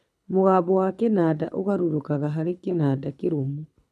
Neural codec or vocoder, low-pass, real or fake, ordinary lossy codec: codec, 24 kHz, 6 kbps, HILCodec; none; fake; none